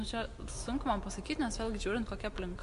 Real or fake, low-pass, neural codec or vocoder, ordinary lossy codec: real; 10.8 kHz; none; MP3, 64 kbps